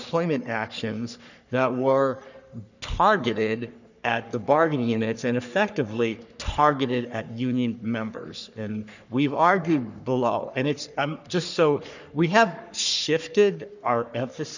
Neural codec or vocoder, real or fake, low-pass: codec, 44.1 kHz, 3.4 kbps, Pupu-Codec; fake; 7.2 kHz